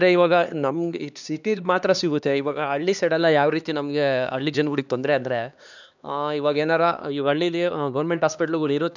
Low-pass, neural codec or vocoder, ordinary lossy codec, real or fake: 7.2 kHz; codec, 16 kHz, 2 kbps, X-Codec, HuBERT features, trained on LibriSpeech; none; fake